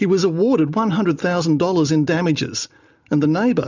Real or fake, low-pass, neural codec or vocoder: real; 7.2 kHz; none